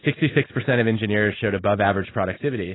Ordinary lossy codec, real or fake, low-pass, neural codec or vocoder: AAC, 16 kbps; real; 7.2 kHz; none